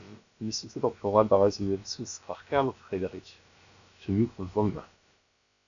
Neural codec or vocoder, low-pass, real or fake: codec, 16 kHz, about 1 kbps, DyCAST, with the encoder's durations; 7.2 kHz; fake